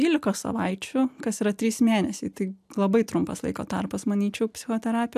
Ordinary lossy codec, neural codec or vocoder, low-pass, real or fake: AAC, 96 kbps; none; 14.4 kHz; real